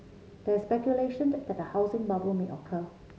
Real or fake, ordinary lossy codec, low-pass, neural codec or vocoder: real; none; none; none